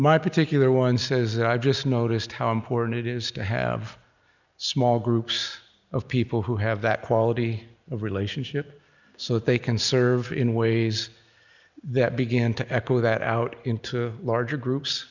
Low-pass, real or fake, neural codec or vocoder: 7.2 kHz; real; none